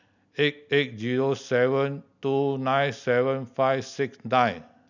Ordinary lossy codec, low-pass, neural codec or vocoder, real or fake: none; 7.2 kHz; none; real